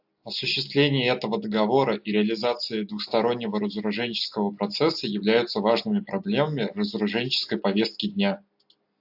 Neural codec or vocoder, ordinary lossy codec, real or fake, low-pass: none; AAC, 48 kbps; real; 5.4 kHz